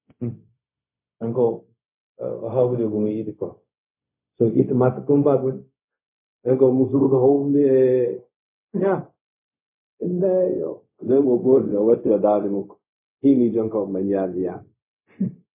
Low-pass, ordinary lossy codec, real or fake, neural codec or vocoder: 3.6 kHz; MP3, 24 kbps; fake; codec, 16 kHz, 0.4 kbps, LongCat-Audio-Codec